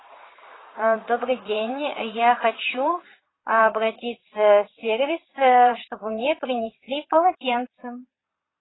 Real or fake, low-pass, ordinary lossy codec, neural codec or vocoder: fake; 7.2 kHz; AAC, 16 kbps; vocoder, 22.05 kHz, 80 mel bands, Vocos